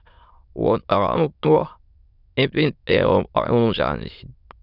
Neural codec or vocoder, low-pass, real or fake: autoencoder, 22.05 kHz, a latent of 192 numbers a frame, VITS, trained on many speakers; 5.4 kHz; fake